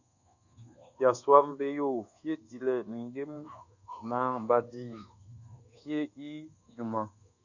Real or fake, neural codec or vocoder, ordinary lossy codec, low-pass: fake; codec, 24 kHz, 1.2 kbps, DualCodec; Opus, 64 kbps; 7.2 kHz